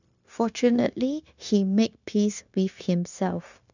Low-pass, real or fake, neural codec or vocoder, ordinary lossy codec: 7.2 kHz; fake; codec, 16 kHz, 0.9 kbps, LongCat-Audio-Codec; none